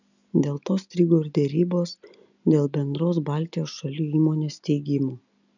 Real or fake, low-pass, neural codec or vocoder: real; 7.2 kHz; none